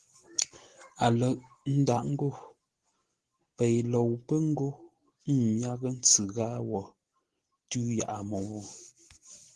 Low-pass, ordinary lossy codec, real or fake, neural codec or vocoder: 10.8 kHz; Opus, 16 kbps; real; none